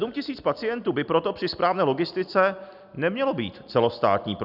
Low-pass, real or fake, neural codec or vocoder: 5.4 kHz; real; none